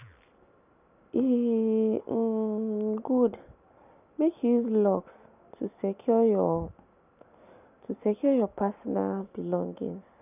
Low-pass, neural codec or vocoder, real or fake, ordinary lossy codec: 3.6 kHz; none; real; none